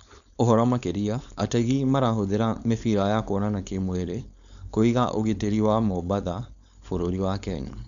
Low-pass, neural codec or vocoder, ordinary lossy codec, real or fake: 7.2 kHz; codec, 16 kHz, 4.8 kbps, FACodec; none; fake